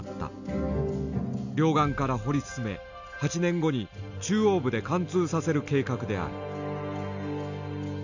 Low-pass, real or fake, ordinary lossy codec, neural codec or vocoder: 7.2 kHz; real; none; none